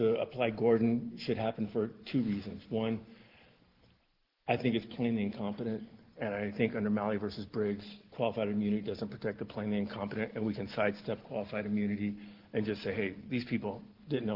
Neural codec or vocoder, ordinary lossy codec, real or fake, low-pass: none; Opus, 16 kbps; real; 5.4 kHz